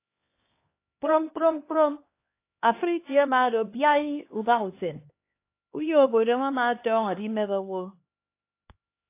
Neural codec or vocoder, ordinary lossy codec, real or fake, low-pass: codec, 16 kHz, 2 kbps, X-Codec, HuBERT features, trained on LibriSpeech; AAC, 24 kbps; fake; 3.6 kHz